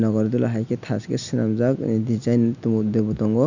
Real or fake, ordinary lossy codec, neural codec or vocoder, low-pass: real; none; none; 7.2 kHz